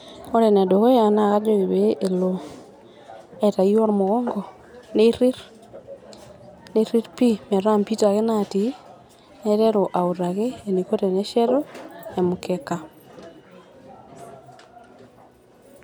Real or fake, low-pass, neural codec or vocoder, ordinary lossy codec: real; 19.8 kHz; none; none